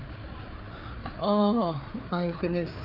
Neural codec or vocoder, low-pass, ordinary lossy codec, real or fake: codec, 16 kHz, 4 kbps, FreqCodec, larger model; 5.4 kHz; none; fake